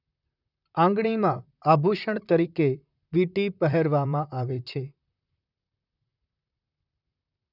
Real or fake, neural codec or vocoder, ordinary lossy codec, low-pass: fake; vocoder, 44.1 kHz, 128 mel bands, Pupu-Vocoder; none; 5.4 kHz